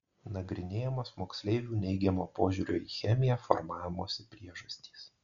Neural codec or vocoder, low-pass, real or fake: none; 7.2 kHz; real